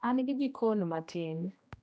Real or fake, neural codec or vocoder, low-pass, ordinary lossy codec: fake; codec, 16 kHz, 1 kbps, X-Codec, HuBERT features, trained on general audio; none; none